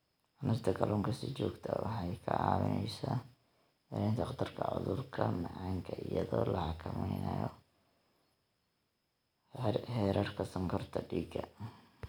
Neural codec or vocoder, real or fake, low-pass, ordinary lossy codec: none; real; none; none